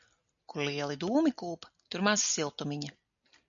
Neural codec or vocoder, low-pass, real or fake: none; 7.2 kHz; real